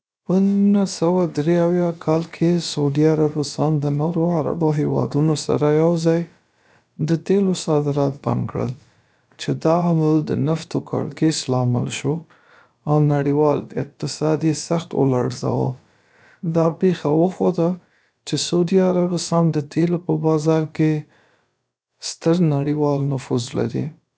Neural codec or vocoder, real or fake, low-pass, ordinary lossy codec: codec, 16 kHz, about 1 kbps, DyCAST, with the encoder's durations; fake; none; none